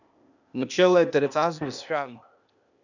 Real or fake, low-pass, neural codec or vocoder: fake; 7.2 kHz; codec, 16 kHz, 0.8 kbps, ZipCodec